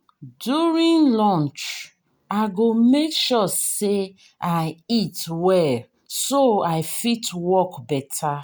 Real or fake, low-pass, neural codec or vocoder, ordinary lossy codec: real; none; none; none